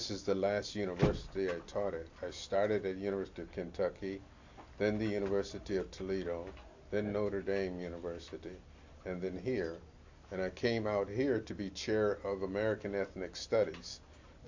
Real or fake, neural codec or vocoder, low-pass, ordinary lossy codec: real; none; 7.2 kHz; AAC, 48 kbps